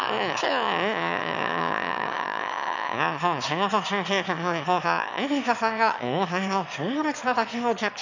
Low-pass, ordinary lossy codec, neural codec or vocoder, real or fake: 7.2 kHz; none; autoencoder, 22.05 kHz, a latent of 192 numbers a frame, VITS, trained on one speaker; fake